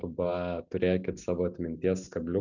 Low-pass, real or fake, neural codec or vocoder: 7.2 kHz; real; none